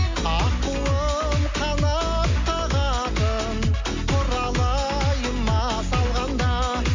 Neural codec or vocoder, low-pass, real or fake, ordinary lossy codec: none; 7.2 kHz; real; MP3, 48 kbps